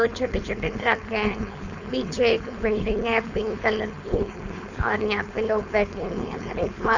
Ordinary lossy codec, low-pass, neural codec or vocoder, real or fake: none; 7.2 kHz; codec, 16 kHz, 4.8 kbps, FACodec; fake